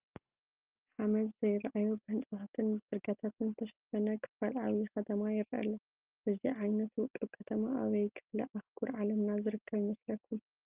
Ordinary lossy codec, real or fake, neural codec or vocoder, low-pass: Opus, 24 kbps; real; none; 3.6 kHz